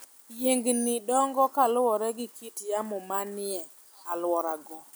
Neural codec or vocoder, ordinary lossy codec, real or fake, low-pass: none; none; real; none